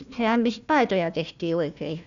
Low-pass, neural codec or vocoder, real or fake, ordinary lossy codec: 7.2 kHz; codec, 16 kHz, 1 kbps, FunCodec, trained on Chinese and English, 50 frames a second; fake; none